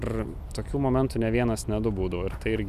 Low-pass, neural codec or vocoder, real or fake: 14.4 kHz; none; real